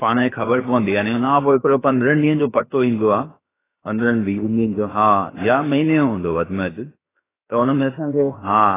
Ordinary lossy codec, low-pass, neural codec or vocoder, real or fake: AAC, 16 kbps; 3.6 kHz; codec, 16 kHz, about 1 kbps, DyCAST, with the encoder's durations; fake